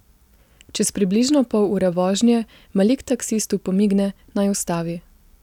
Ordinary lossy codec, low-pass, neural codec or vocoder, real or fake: none; 19.8 kHz; none; real